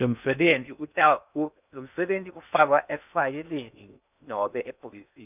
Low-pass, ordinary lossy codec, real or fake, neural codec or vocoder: 3.6 kHz; none; fake; codec, 16 kHz in and 24 kHz out, 0.6 kbps, FocalCodec, streaming, 2048 codes